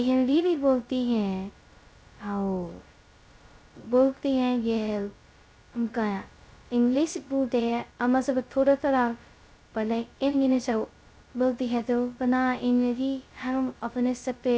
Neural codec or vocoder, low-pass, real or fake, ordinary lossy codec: codec, 16 kHz, 0.2 kbps, FocalCodec; none; fake; none